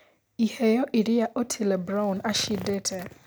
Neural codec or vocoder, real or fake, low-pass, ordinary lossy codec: none; real; none; none